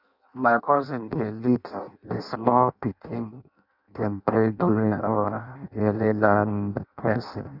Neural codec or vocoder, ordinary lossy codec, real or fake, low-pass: codec, 16 kHz in and 24 kHz out, 0.6 kbps, FireRedTTS-2 codec; none; fake; 5.4 kHz